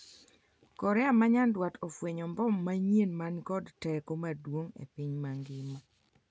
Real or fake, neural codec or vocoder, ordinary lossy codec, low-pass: real; none; none; none